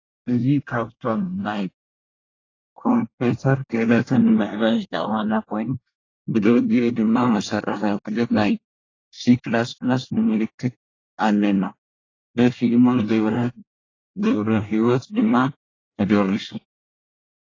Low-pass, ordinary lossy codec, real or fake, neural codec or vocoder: 7.2 kHz; AAC, 32 kbps; fake; codec, 24 kHz, 1 kbps, SNAC